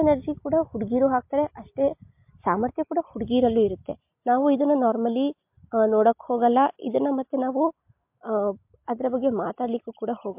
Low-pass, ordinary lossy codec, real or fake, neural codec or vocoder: 3.6 kHz; MP3, 32 kbps; real; none